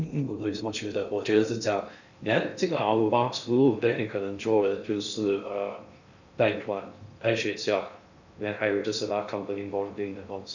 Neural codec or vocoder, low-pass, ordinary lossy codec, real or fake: codec, 16 kHz in and 24 kHz out, 0.6 kbps, FocalCodec, streaming, 2048 codes; 7.2 kHz; none; fake